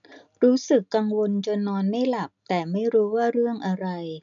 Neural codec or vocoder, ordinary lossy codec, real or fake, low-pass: none; none; real; 7.2 kHz